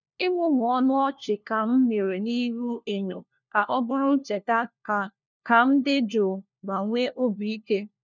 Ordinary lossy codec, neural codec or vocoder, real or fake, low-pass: none; codec, 16 kHz, 1 kbps, FunCodec, trained on LibriTTS, 50 frames a second; fake; 7.2 kHz